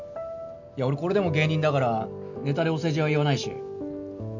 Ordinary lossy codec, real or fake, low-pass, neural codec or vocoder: none; real; 7.2 kHz; none